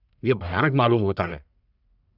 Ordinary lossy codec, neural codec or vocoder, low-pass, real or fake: none; codec, 44.1 kHz, 1.7 kbps, Pupu-Codec; 5.4 kHz; fake